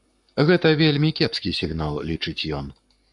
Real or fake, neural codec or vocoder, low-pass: fake; codec, 44.1 kHz, 7.8 kbps, Pupu-Codec; 10.8 kHz